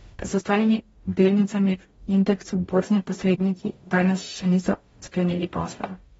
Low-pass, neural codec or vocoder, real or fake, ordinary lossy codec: 19.8 kHz; codec, 44.1 kHz, 0.9 kbps, DAC; fake; AAC, 24 kbps